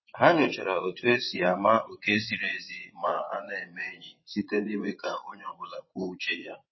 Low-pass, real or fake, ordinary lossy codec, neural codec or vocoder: 7.2 kHz; fake; MP3, 24 kbps; vocoder, 22.05 kHz, 80 mel bands, WaveNeXt